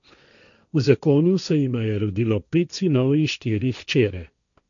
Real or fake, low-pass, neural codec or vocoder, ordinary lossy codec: fake; 7.2 kHz; codec, 16 kHz, 1.1 kbps, Voila-Tokenizer; none